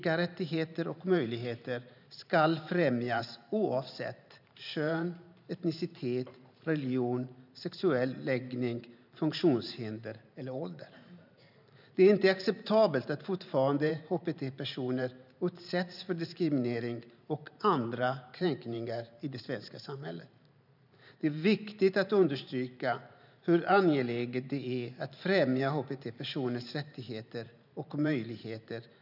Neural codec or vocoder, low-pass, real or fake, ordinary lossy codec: vocoder, 44.1 kHz, 128 mel bands every 512 samples, BigVGAN v2; 5.4 kHz; fake; none